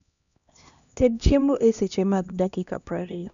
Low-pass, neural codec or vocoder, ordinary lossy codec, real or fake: 7.2 kHz; codec, 16 kHz, 1 kbps, X-Codec, HuBERT features, trained on LibriSpeech; none; fake